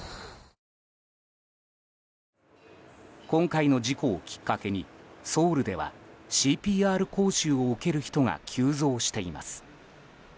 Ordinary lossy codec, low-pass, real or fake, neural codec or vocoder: none; none; real; none